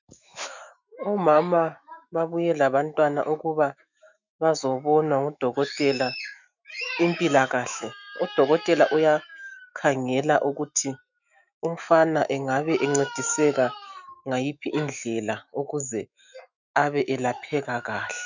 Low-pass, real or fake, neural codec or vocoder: 7.2 kHz; fake; autoencoder, 48 kHz, 128 numbers a frame, DAC-VAE, trained on Japanese speech